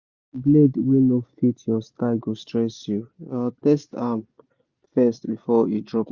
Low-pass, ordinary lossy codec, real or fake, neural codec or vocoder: 7.2 kHz; Opus, 64 kbps; real; none